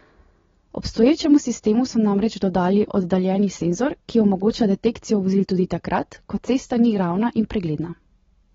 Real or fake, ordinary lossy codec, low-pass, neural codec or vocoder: real; AAC, 24 kbps; 7.2 kHz; none